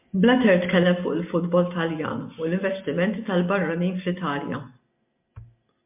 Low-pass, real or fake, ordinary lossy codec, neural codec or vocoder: 3.6 kHz; real; MP3, 32 kbps; none